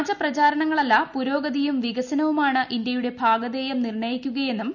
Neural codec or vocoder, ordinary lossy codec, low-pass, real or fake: none; none; 7.2 kHz; real